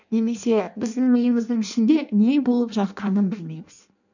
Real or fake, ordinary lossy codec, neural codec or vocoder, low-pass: fake; none; codec, 16 kHz in and 24 kHz out, 0.6 kbps, FireRedTTS-2 codec; 7.2 kHz